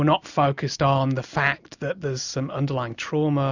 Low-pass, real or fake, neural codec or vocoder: 7.2 kHz; real; none